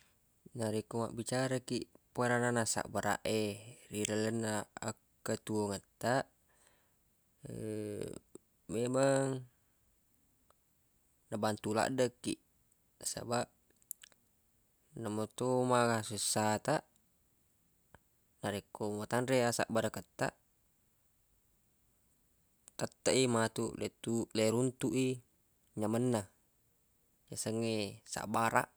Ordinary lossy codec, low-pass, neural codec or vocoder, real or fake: none; none; none; real